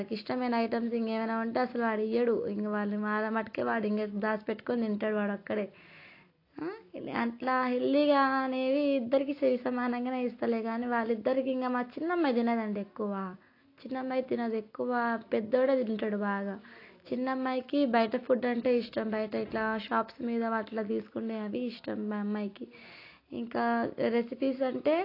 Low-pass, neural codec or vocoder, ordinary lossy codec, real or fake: 5.4 kHz; none; AAC, 32 kbps; real